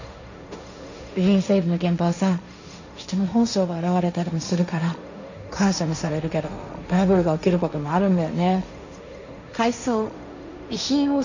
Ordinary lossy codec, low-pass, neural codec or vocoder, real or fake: none; 7.2 kHz; codec, 16 kHz, 1.1 kbps, Voila-Tokenizer; fake